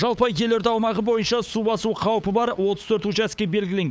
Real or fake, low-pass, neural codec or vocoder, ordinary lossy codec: fake; none; codec, 16 kHz, 8 kbps, FunCodec, trained on LibriTTS, 25 frames a second; none